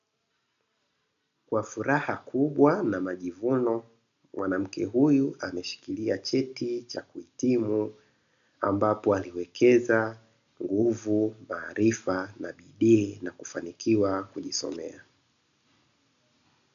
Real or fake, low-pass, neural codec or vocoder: real; 7.2 kHz; none